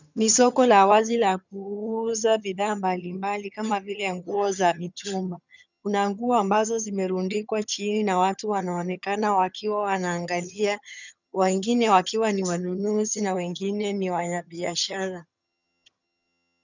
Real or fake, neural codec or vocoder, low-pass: fake; vocoder, 22.05 kHz, 80 mel bands, HiFi-GAN; 7.2 kHz